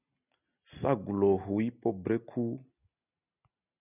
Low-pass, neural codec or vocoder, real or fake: 3.6 kHz; none; real